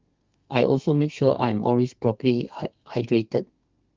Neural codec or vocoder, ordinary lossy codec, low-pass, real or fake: codec, 32 kHz, 1.9 kbps, SNAC; Opus, 32 kbps; 7.2 kHz; fake